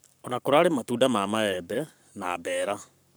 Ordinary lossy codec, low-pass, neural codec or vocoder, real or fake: none; none; codec, 44.1 kHz, 7.8 kbps, Pupu-Codec; fake